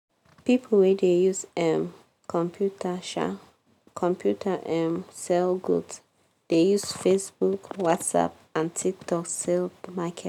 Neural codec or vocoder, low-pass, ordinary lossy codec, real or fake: none; 19.8 kHz; none; real